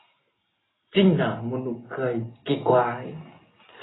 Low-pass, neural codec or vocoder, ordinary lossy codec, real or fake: 7.2 kHz; none; AAC, 16 kbps; real